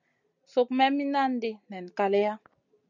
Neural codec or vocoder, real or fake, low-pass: none; real; 7.2 kHz